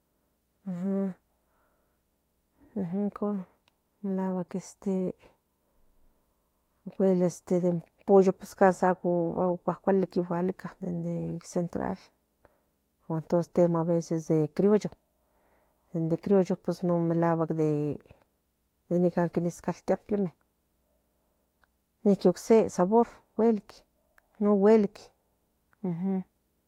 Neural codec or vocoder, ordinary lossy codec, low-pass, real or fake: autoencoder, 48 kHz, 32 numbers a frame, DAC-VAE, trained on Japanese speech; AAC, 48 kbps; 19.8 kHz; fake